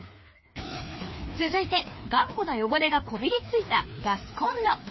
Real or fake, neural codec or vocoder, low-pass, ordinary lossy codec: fake; codec, 16 kHz, 2 kbps, FreqCodec, larger model; 7.2 kHz; MP3, 24 kbps